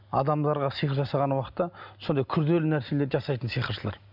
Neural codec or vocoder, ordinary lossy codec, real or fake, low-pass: none; none; real; 5.4 kHz